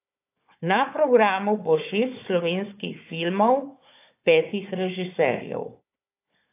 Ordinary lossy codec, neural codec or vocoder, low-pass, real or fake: AAC, 24 kbps; codec, 16 kHz, 4 kbps, FunCodec, trained on Chinese and English, 50 frames a second; 3.6 kHz; fake